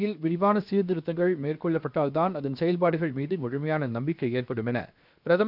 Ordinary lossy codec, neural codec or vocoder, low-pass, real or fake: none; codec, 16 kHz, 0.7 kbps, FocalCodec; 5.4 kHz; fake